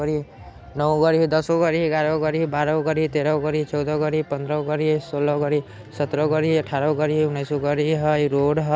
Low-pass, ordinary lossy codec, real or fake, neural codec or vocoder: none; none; real; none